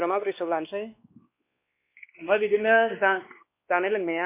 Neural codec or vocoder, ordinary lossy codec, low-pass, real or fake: codec, 16 kHz, 2 kbps, X-Codec, WavLM features, trained on Multilingual LibriSpeech; MP3, 24 kbps; 3.6 kHz; fake